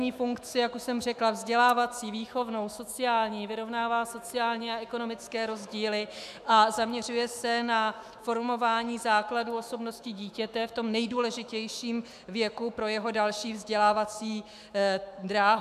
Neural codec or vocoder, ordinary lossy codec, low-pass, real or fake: autoencoder, 48 kHz, 128 numbers a frame, DAC-VAE, trained on Japanese speech; MP3, 96 kbps; 14.4 kHz; fake